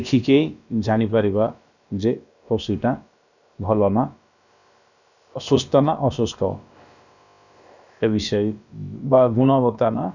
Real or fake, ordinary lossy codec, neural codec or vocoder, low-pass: fake; none; codec, 16 kHz, about 1 kbps, DyCAST, with the encoder's durations; 7.2 kHz